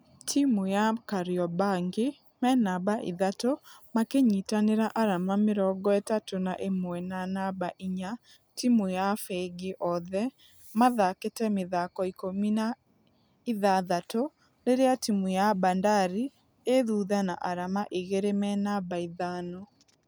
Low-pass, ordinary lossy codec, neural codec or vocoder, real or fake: none; none; none; real